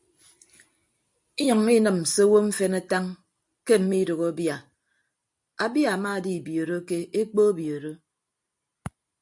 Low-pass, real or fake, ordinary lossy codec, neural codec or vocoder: 10.8 kHz; real; MP3, 64 kbps; none